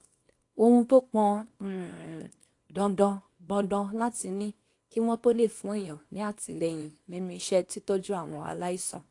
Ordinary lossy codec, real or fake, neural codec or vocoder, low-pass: AAC, 48 kbps; fake; codec, 24 kHz, 0.9 kbps, WavTokenizer, small release; 10.8 kHz